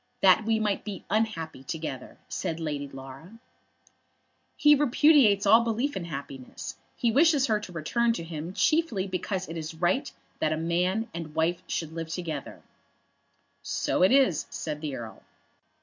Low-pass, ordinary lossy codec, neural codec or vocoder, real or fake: 7.2 kHz; MP3, 48 kbps; none; real